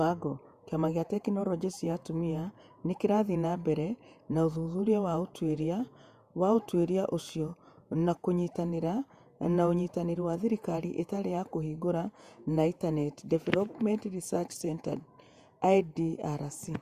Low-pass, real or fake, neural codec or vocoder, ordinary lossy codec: 14.4 kHz; fake; vocoder, 48 kHz, 128 mel bands, Vocos; Opus, 64 kbps